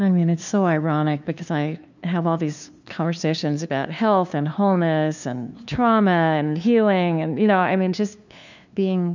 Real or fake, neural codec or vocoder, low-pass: fake; codec, 16 kHz, 2 kbps, FunCodec, trained on LibriTTS, 25 frames a second; 7.2 kHz